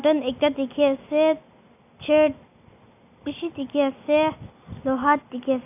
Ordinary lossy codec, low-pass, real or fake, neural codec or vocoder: none; 3.6 kHz; real; none